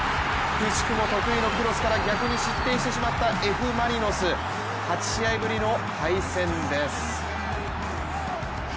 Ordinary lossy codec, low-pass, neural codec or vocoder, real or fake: none; none; none; real